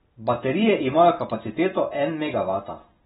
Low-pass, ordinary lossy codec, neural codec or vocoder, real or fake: 10.8 kHz; AAC, 16 kbps; none; real